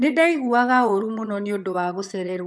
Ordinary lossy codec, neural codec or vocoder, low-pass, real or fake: none; vocoder, 22.05 kHz, 80 mel bands, HiFi-GAN; none; fake